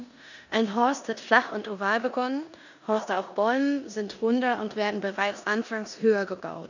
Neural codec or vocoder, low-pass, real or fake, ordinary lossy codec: codec, 16 kHz in and 24 kHz out, 0.9 kbps, LongCat-Audio-Codec, four codebook decoder; 7.2 kHz; fake; none